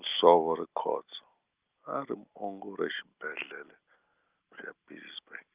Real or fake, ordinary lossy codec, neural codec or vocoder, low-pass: real; Opus, 32 kbps; none; 3.6 kHz